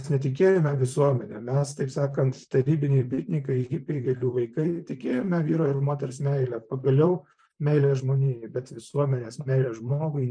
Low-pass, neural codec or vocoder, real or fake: 9.9 kHz; vocoder, 44.1 kHz, 128 mel bands, Pupu-Vocoder; fake